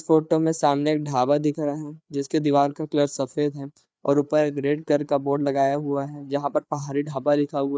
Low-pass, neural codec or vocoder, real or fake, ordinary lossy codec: none; codec, 16 kHz, 4 kbps, FreqCodec, larger model; fake; none